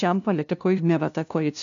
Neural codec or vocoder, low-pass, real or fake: codec, 16 kHz, 0.5 kbps, FunCodec, trained on LibriTTS, 25 frames a second; 7.2 kHz; fake